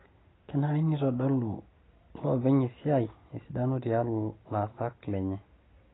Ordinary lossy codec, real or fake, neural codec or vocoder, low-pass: AAC, 16 kbps; real; none; 7.2 kHz